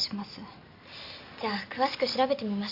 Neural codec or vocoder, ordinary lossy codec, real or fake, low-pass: none; Opus, 64 kbps; real; 5.4 kHz